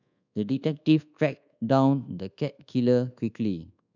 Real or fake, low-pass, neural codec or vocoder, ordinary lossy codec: fake; 7.2 kHz; codec, 24 kHz, 1.2 kbps, DualCodec; none